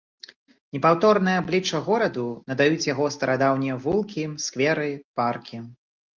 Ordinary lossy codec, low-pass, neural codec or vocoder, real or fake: Opus, 24 kbps; 7.2 kHz; none; real